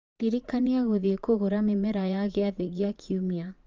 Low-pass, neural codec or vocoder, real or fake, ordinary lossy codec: 7.2 kHz; vocoder, 44.1 kHz, 80 mel bands, Vocos; fake; Opus, 24 kbps